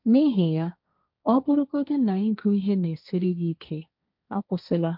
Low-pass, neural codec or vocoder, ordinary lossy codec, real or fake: 5.4 kHz; codec, 16 kHz, 1.1 kbps, Voila-Tokenizer; MP3, 48 kbps; fake